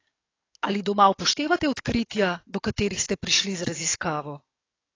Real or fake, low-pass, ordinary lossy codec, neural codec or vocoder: fake; 7.2 kHz; AAC, 32 kbps; codec, 44.1 kHz, 7.8 kbps, DAC